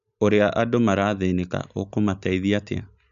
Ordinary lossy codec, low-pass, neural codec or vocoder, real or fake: none; 7.2 kHz; none; real